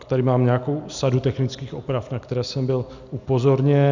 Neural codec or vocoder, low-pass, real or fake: none; 7.2 kHz; real